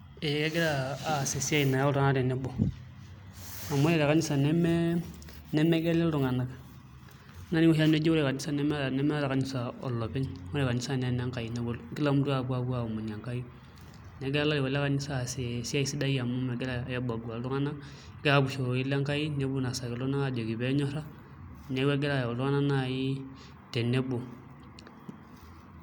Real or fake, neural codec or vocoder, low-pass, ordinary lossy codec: real; none; none; none